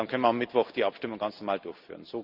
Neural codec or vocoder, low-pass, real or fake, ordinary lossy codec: none; 5.4 kHz; real; Opus, 24 kbps